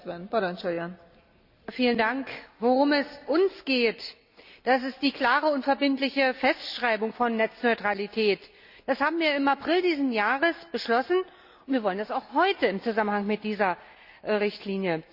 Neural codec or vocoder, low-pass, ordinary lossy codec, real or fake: none; 5.4 kHz; Opus, 64 kbps; real